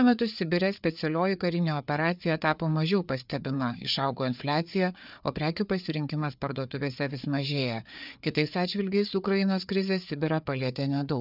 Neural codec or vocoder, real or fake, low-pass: codec, 16 kHz, 4 kbps, FreqCodec, larger model; fake; 5.4 kHz